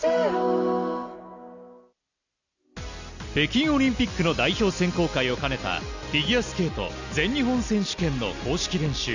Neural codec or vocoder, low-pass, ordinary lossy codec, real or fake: none; 7.2 kHz; none; real